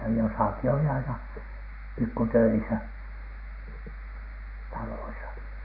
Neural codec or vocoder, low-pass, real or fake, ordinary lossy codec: none; 5.4 kHz; real; none